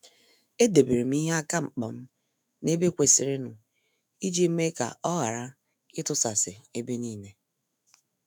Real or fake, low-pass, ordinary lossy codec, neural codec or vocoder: fake; none; none; autoencoder, 48 kHz, 128 numbers a frame, DAC-VAE, trained on Japanese speech